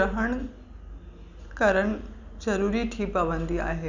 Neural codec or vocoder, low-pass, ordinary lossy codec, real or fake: none; 7.2 kHz; none; real